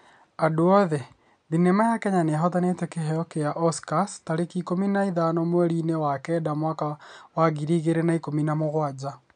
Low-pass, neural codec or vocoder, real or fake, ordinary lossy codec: 9.9 kHz; none; real; none